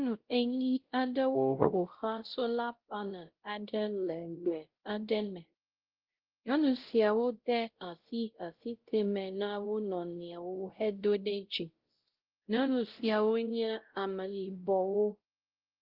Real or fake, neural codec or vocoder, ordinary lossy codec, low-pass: fake; codec, 16 kHz, 0.5 kbps, X-Codec, WavLM features, trained on Multilingual LibriSpeech; Opus, 16 kbps; 5.4 kHz